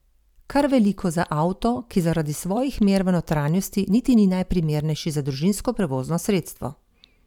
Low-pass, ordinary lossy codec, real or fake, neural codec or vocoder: 19.8 kHz; none; fake; vocoder, 44.1 kHz, 128 mel bands every 512 samples, BigVGAN v2